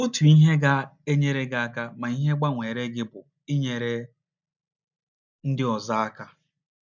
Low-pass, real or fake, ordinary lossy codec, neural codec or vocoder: 7.2 kHz; real; none; none